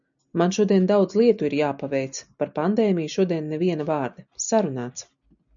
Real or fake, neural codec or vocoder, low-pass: real; none; 7.2 kHz